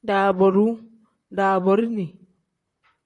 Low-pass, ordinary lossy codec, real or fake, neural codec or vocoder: 10.8 kHz; AAC, 64 kbps; fake; vocoder, 44.1 kHz, 128 mel bands, Pupu-Vocoder